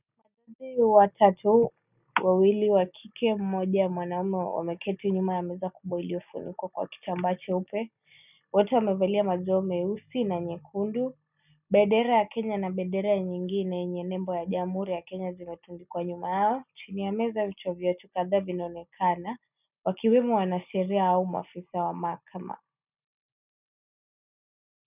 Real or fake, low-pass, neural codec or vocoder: real; 3.6 kHz; none